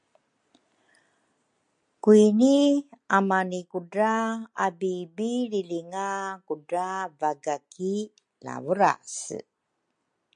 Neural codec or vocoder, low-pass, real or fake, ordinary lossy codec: none; 9.9 kHz; real; MP3, 64 kbps